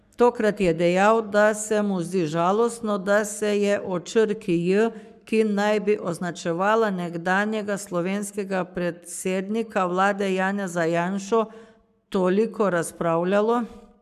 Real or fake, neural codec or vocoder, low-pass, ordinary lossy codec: fake; codec, 44.1 kHz, 7.8 kbps, Pupu-Codec; 14.4 kHz; none